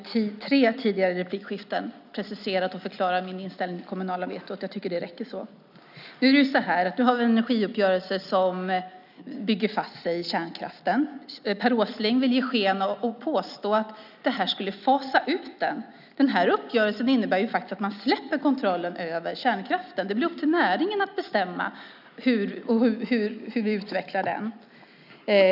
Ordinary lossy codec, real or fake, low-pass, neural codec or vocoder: none; real; 5.4 kHz; none